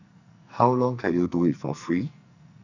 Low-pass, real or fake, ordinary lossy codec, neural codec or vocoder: 7.2 kHz; fake; none; codec, 44.1 kHz, 2.6 kbps, SNAC